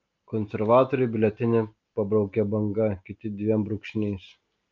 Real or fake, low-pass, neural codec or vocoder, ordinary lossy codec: real; 7.2 kHz; none; Opus, 24 kbps